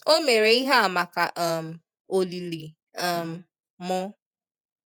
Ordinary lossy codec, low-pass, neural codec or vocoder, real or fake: none; none; vocoder, 48 kHz, 128 mel bands, Vocos; fake